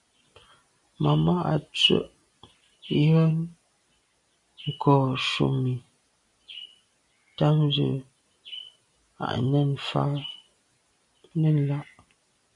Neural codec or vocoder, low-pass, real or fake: none; 10.8 kHz; real